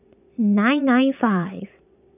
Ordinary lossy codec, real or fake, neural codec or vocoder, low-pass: none; fake; vocoder, 22.05 kHz, 80 mel bands, Vocos; 3.6 kHz